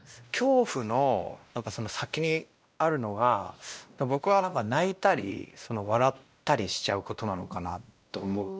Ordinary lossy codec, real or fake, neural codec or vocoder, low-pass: none; fake; codec, 16 kHz, 1 kbps, X-Codec, WavLM features, trained on Multilingual LibriSpeech; none